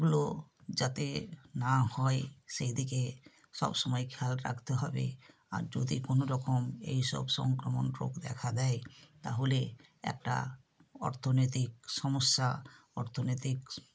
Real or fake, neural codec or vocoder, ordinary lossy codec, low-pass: real; none; none; none